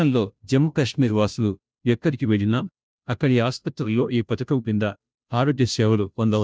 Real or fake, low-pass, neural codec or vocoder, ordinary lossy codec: fake; none; codec, 16 kHz, 0.5 kbps, FunCodec, trained on Chinese and English, 25 frames a second; none